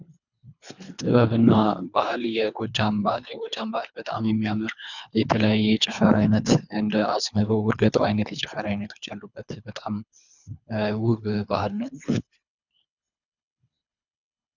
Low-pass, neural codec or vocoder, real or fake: 7.2 kHz; codec, 24 kHz, 3 kbps, HILCodec; fake